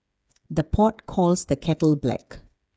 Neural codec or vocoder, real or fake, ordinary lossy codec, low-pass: codec, 16 kHz, 8 kbps, FreqCodec, smaller model; fake; none; none